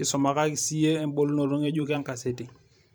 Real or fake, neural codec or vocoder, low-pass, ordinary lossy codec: fake; vocoder, 44.1 kHz, 128 mel bands every 512 samples, BigVGAN v2; none; none